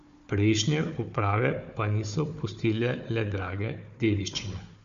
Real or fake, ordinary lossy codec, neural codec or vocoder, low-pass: fake; Opus, 64 kbps; codec, 16 kHz, 4 kbps, FunCodec, trained on Chinese and English, 50 frames a second; 7.2 kHz